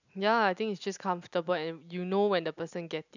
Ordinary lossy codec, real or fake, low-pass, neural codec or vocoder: none; real; 7.2 kHz; none